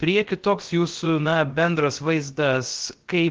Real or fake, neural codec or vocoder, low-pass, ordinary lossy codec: fake; codec, 16 kHz, 0.7 kbps, FocalCodec; 7.2 kHz; Opus, 16 kbps